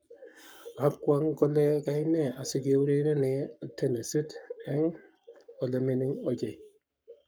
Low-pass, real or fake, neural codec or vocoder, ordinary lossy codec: none; fake; codec, 44.1 kHz, 7.8 kbps, Pupu-Codec; none